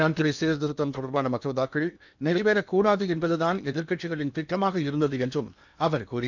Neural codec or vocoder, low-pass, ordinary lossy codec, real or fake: codec, 16 kHz in and 24 kHz out, 0.8 kbps, FocalCodec, streaming, 65536 codes; 7.2 kHz; none; fake